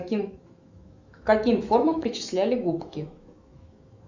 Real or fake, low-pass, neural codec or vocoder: fake; 7.2 kHz; autoencoder, 48 kHz, 128 numbers a frame, DAC-VAE, trained on Japanese speech